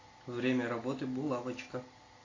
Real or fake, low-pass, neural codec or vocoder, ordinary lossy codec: real; 7.2 kHz; none; AAC, 32 kbps